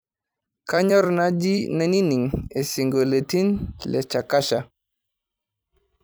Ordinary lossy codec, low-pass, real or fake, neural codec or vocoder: none; none; fake; vocoder, 44.1 kHz, 128 mel bands every 256 samples, BigVGAN v2